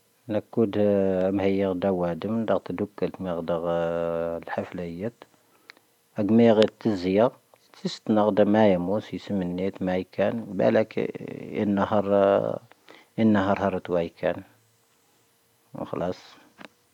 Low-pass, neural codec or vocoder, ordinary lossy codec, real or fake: 19.8 kHz; none; none; real